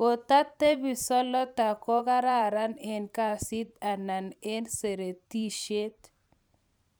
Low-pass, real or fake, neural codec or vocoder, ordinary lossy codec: none; fake; vocoder, 44.1 kHz, 128 mel bands every 512 samples, BigVGAN v2; none